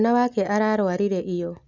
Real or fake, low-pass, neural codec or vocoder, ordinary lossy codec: real; 7.2 kHz; none; none